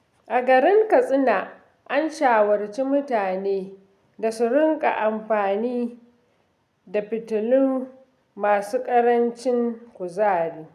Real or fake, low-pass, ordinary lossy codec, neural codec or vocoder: real; 14.4 kHz; none; none